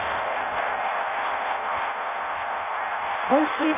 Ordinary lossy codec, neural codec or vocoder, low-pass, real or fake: none; codec, 16 kHz in and 24 kHz out, 0.6 kbps, FireRedTTS-2 codec; 3.6 kHz; fake